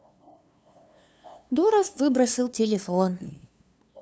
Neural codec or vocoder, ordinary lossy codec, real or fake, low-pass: codec, 16 kHz, 2 kbps, FunCodec, trained on LibriTTS, 25 frames a second; none; fake; none